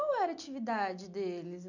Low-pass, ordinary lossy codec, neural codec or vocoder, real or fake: 7.2 kHz; none; none; real